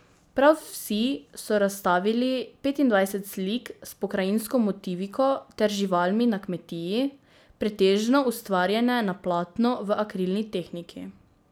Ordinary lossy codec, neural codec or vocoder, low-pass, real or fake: none; none; none; real